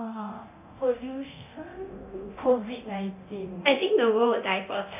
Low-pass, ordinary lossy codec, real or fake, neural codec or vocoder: 3.6 kHz; AAC, 32 kbps; fake; codec, 24 kHz, 0.9 kbps, DualCodec